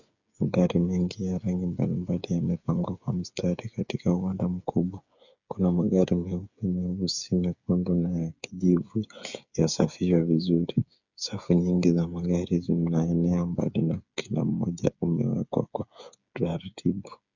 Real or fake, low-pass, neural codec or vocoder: fake; 7.2 kHz; codec, 16 kHz, 8 kbps, FreqCodec, smaller model